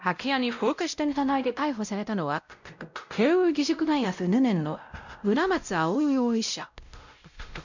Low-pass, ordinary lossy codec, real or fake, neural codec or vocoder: 7.2 kHz; none; fake; codec, 16 kHz, 0.5 kbps, X-Codec, WavLM features, trained on Multilingual LibriSpeech